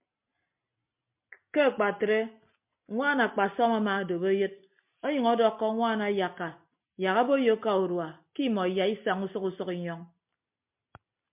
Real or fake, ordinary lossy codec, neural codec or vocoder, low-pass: real; MP3, 32 kbps; none; 3.6 kHz